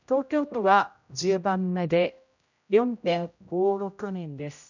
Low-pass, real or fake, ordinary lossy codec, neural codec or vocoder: 7.2 kHz; fake; none; codec, 16 kHz, 0.5 kbps, X-Codec, HuBERT features, trained on general audio